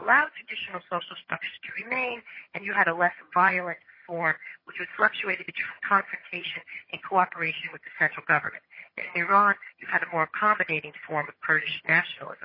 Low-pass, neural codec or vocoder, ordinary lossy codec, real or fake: 5.4 kHz; vocoder, 22.05 kHz, 80 mel bands, HiFi-GAN; MP3, 24 kbps; fake